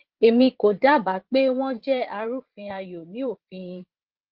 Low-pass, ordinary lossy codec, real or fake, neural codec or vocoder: 5.4 kHz; Opus, 16 kbps; fake; vocoder, 22.05 kHz, 80 mel bands, Vocos